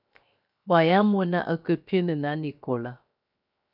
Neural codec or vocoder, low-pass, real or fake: codec, 16 kHz, 0.7 kbps, FocalCodec; 5.4 kHz; fake